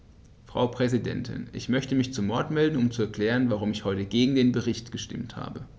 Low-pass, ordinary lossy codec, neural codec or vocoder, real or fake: none; none; none; real